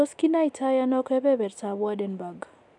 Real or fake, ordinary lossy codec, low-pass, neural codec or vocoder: real; none; 10.8 kHz; none